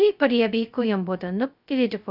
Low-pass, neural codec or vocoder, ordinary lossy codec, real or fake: 5.4 kHz; codec, 16 kHz, 0.2 kbps, FocalCodec; none; fake